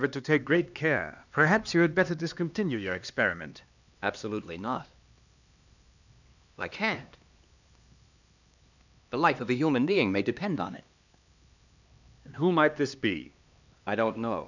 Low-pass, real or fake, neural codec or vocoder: 7.2 kHz; fake; codec, 16 kHz, 2 kbps, X-Codec, HuBERT features, trained on LibriSpeech